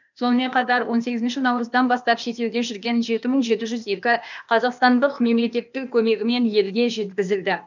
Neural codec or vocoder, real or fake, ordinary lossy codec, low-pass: codec, 16 kHz, 0.8 kbps, ZipCodec; fake; none; 7.2 kHz